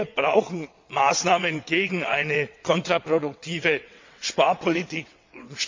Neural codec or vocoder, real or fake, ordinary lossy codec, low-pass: vocoder, 22.05 kHz, 80 mel bands, WaveNeXt; fake; MP3, 64 kbps; 7.2 kHz